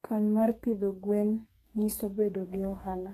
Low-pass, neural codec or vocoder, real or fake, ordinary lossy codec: 14.4 kHz; codec, 32 kHz, 1.9 kbps, SNAC; fake; AAC, 48 kbps